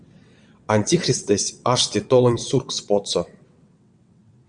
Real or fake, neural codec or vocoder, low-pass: fake; vocoder, 22.05 kHz, 80 mel bands, WaveNeXt; 9.9 kHz